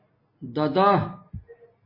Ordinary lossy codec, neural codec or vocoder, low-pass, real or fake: MP3, 24 kbps; none; 5.4 kHz; real